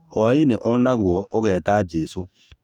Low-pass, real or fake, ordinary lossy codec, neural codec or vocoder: 19.8 kHz; fake; none; codec, 44.1 kHz, 2.6 kbps, DAC